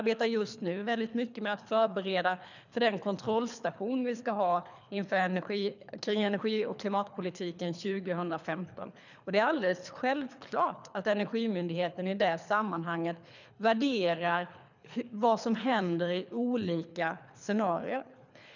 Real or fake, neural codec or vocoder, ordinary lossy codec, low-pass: fake; codec, 24 kHz, 3 kbps, HILCodec; none; 7.2 kHz